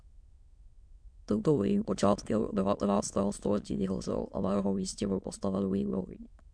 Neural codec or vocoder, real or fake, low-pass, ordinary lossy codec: autoencoder, 22.05 kHz, a latent of 192 numbers a frame, VITS, trained on many speakers; fake; 9.9 kHz; MP3, 64 kbps